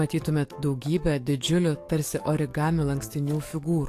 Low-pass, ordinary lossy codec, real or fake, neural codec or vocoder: 14.4 kHz; AAC, 64 kbps; fake; codec, 44.1 kHz, 7.8 kbps, DAC